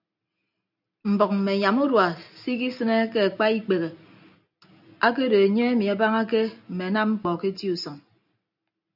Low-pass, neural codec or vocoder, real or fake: 5.4 kHz; none; real